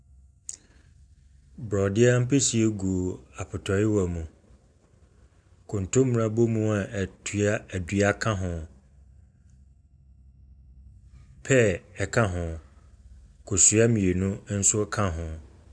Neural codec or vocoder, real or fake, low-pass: none; real; 9.9 kHz